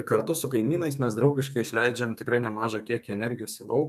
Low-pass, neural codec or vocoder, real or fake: 14.4 kHz; codec, 32 kHz, 1.9 kbps, SNAC; fake